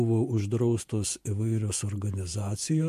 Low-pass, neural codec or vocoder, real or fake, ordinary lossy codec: 14.4 kHz; vocoder, 48 kHz, 128 mel bands, Vocos; fake; MP3, 64 kbps